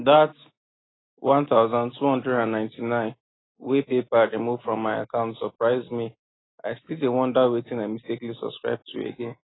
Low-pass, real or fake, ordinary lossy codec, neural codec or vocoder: 7.2 kHz; real; AAC, 16 kbps; none